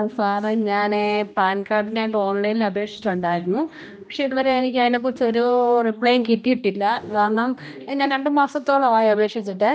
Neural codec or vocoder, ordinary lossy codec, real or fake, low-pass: codec, 16 kHz, 1 kbps, X-Codec, HuBERT features, trained on general audio; none; fake; none